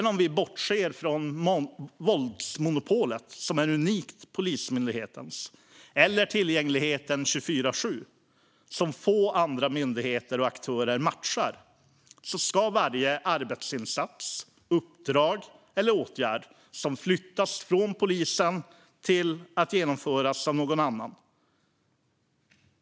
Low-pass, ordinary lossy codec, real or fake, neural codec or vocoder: none; none; real; none